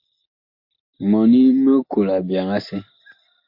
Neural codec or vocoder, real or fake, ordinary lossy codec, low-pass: none; real; MP3, 32 kbps; 5.4 kHz